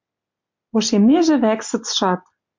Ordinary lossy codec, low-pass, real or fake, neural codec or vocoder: MP3, 64 kbps; 7.2 kHz; real; none